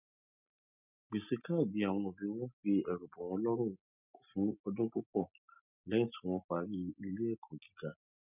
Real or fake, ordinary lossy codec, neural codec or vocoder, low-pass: real; none; none; 3.6 kHz